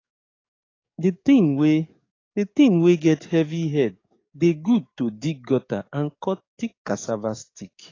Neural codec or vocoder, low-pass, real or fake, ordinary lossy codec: codec, 44.1 kHz, 7.8 kbps, DAC; 7.2 kHz; fake; AAC, 32 kbps